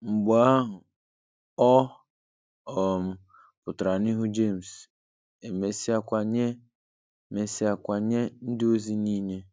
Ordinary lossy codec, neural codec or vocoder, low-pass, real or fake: none; none; none; real